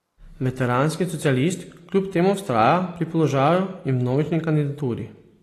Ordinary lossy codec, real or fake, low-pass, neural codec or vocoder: AAC, 48 kbps; real; 14.4 kHz; none